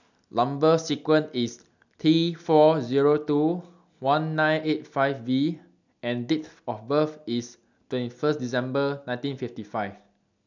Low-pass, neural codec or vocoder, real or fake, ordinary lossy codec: 7.2 kHz; none; real; none